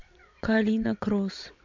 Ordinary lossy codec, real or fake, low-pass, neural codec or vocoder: MP3, 48 kbps; fake; 7.2 kHz; vocoder, 22.05 kHz, 80 mel bands, WaveNeXt